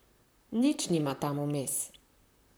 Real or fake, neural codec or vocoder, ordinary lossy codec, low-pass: fake; vocoder, 44.1 kHz, 128 mel bands, Pupu-Vocoder; none; none